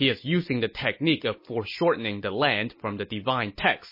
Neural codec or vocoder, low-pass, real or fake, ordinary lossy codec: none; 5.4 kHz; real; MP3, 24 kbps